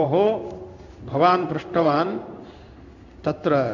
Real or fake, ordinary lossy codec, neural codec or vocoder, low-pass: fake; none; codec, 16 kHz in and 24 kHz out, 1 kbps, XY-Tokenizer; 7.2 kHz